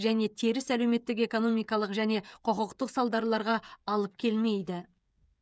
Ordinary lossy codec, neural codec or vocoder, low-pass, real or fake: none; codec, 16 kHz, 16 kbps, FreqCodec, smaller model; none; fake